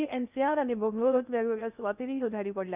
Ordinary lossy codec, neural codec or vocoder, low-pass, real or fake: none; codec, 16 kHz in and 24 kHz out, 0.6 kbps, FocalCodec, streaming, 2048 codes; 3.6 kHz; fake